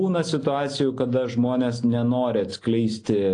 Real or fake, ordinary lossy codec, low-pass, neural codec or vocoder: real; AAC, 48 kbps; 9.9 kHz; none